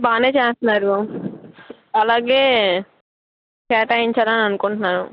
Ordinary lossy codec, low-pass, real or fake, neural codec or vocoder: none; 5.4 kHz; real; none